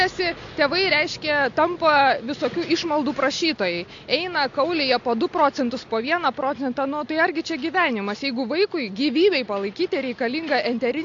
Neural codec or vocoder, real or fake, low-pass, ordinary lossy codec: none; real; 7.2 kHz; AAC, 48 kbps